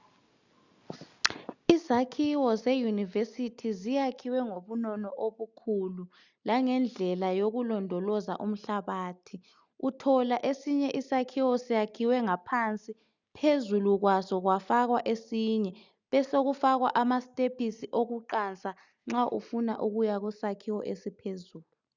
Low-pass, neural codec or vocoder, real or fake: 7.2 kHz; none; real